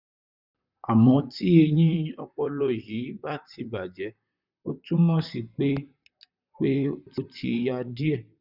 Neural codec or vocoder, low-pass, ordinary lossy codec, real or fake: vocoder, 44.1 kHz, 128 mel bands, Pupu-Vocoder; 5.4 kHz; none; fake